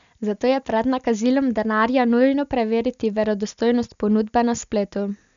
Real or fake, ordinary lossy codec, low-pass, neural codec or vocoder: real; none; 7.2 kHz; none